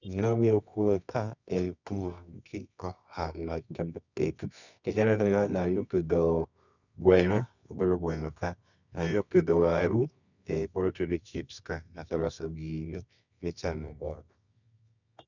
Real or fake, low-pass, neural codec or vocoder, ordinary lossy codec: fake; 7.2 kHz; codec, 24 kHz, 0.9 kbps, WavTokenizer, medium music audio release; none